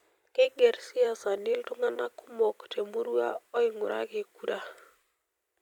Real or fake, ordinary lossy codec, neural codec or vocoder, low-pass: fake; none; vocoder, 44.1 kHz, 128 mel bands every 256 samples, BigVGAN v2; 19.8 kHz